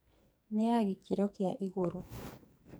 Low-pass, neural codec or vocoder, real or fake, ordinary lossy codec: none; codec, 44.1 kHz, 2.6 kbps, SNAC; fake; none